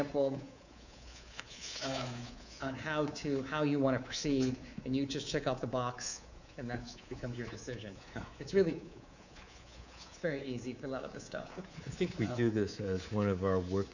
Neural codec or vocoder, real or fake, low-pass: codec, 24 kHz, 3.1 kbps, DualCodec; fake; 7.2 kHz